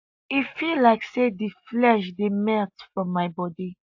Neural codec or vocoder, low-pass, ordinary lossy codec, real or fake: none; 7.2 kHz; AAC, 48 kbps; real